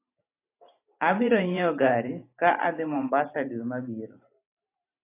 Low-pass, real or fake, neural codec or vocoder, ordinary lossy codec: 3.6 kHz; fake; vocoder, 44.1 kHz, 128 mel bands every 512 samples, BigVGAN v2; AAC, 24 kbps